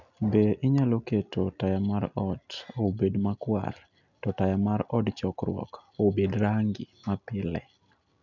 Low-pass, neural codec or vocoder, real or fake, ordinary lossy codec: 7.2 kHz; none; real; none